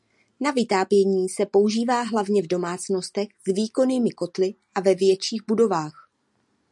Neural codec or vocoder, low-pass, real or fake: none; 10.8 kHz; real